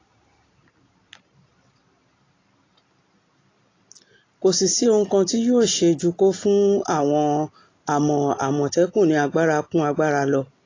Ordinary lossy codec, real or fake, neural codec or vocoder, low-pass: AAC, 32 kbps; real; none; 7.2 kHz